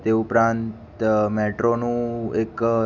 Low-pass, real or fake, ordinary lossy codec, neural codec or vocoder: none; real; none; none